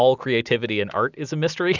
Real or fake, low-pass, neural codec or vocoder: real; 7.2 kHz; none